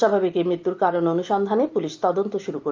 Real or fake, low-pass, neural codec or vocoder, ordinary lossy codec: real; 7.2 kHz; none; Opus, 32 kbps